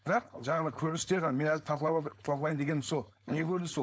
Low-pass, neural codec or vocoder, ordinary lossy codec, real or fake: none; codec, 16 kHz, 4.8 kbps, FACodec; none; fake